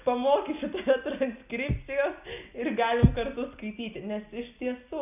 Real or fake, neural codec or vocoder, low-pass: real; none; 3.6 kHz